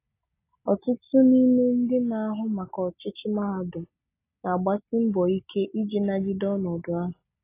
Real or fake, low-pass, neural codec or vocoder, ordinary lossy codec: real; 3.6 kHz; none; AAC, 24 kbps